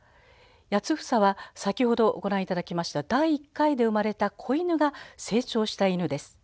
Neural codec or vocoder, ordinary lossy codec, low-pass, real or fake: none; none; none; real